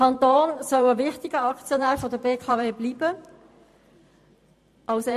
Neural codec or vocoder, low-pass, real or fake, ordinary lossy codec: vocoder, 44.1 kHz, 128 mel bands every 512 samples, BigVGAN v2; 14.4 kHz; fake; MP3, 64 kbps